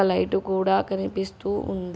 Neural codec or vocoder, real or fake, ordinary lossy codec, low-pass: none; real; none; none